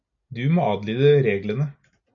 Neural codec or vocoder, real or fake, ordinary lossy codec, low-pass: none; real; AAC, 64 kbps; 7.2 kHz